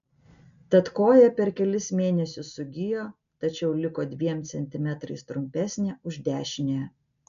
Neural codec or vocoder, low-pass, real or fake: none; 7.2 kHz; real